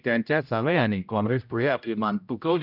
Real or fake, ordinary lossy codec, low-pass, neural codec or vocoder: fake; none; 5.4 kHz; codec, 16 kHz, 0.5 kbps, X-Codec, HuBERT features, trained on general audio